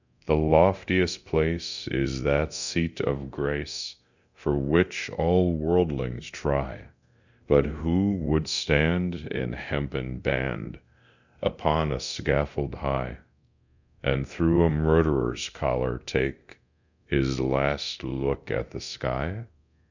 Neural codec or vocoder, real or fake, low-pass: codec, 24 kHz, 0.9 kbps, DualCodec; fake; 7.2 kHz